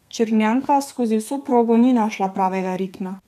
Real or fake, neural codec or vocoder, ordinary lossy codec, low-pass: fake; codec, 32 kHz, 1.9 kbps, SNAC; none; 14.4 kHz